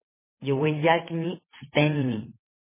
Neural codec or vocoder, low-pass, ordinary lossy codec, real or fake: vocoder, 22.05 kHz, 80 mel bands, WaveNeXt; 3.6 kHz; MP3, 16 kbps; fake